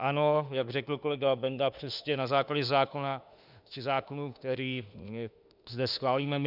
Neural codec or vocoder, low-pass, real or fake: autoencoder, 48 kHz, 32 numbers a frame, DAC-VAE, trained on Japanese speech; 5.4 kHz; fake